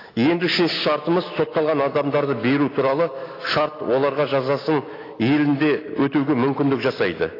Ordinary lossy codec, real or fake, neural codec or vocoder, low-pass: AAC, 24 kbps; real; none; 5.4 kHz